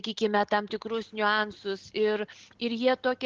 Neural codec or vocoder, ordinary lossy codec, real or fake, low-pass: none; Opus, 16 kbps; real; 7.2 kHz